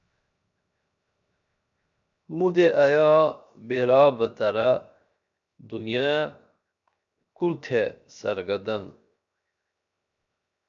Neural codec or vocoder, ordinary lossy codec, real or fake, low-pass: codec, 16 kHz, 0.7 kbps, FocalCodec; MP3, 64 kbps; fake; 7.2 kHz